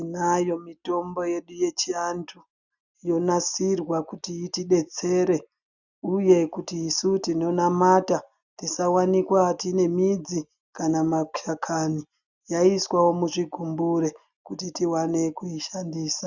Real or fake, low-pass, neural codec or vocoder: real; 7.2 kHz; none